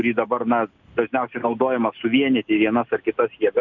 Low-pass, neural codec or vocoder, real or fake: 7.2 kHz; none; real